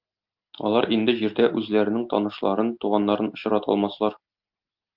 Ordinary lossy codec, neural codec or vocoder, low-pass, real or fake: Opus, 24 kbps; none; 5.4 kHz; real